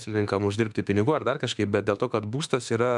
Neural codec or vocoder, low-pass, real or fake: autoencoder, 48 kHz, 32 numbers a frame, DAC-VAE, trained on Japanese speech; 10.8 kHz; fake